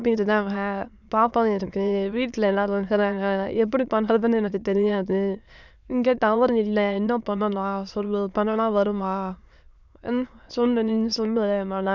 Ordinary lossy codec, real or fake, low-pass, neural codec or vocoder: none; fake; 7.2 kHz; autoencoder, 22.05 kHz, a latent of 192 numbers a frame, VITS, trained on many speakers